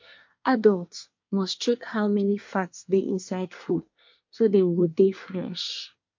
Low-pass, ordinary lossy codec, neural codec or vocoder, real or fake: 7.2 kHz; MP3, 48 kbps; codec, 24 kHz, 1 kbps, SNAC; fake